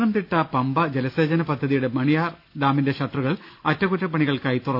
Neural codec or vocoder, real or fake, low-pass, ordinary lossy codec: none; real; 5.4 kHz; none